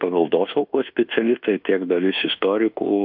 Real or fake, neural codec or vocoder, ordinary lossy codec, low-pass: fake; codec, 24 kHz, 1.2 kbps, DualCodec; AAC, 48 kbps; 5.4 kHz